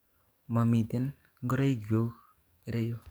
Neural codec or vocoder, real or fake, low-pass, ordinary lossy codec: codec, 44.1 kHz, 7.8 kbps, DAC; fake; none; none